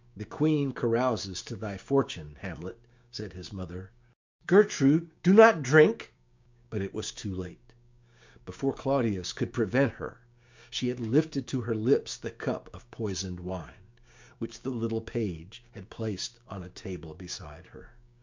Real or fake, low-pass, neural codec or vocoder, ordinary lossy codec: fake; 7.2 kHz; codec, 16 kHz, 6 kbps, DAC; MP3, 64 kbps